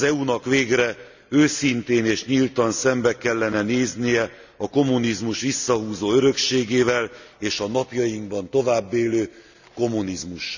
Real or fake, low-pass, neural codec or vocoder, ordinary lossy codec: real; 7.2 kHz; none; none